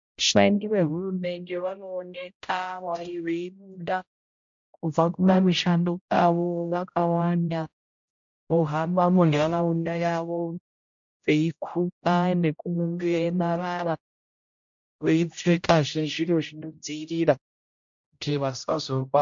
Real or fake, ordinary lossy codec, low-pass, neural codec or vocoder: fake; MP3, 64 kbps; 7.2 kHz; codec, 16 kHz, 0.5 kbps, X-Codec, HuBERT features, trained on general audio